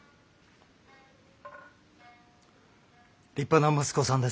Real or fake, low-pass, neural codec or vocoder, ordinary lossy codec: real; none; none; none